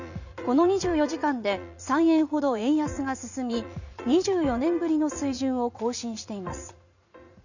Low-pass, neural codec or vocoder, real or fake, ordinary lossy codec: 7.2 kHz; vocoder, 44.1 kHz, 80 mel bands, Vocos; fake; none